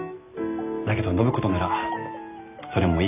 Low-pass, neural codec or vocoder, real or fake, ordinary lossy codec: 3.6 kHz; none; real; none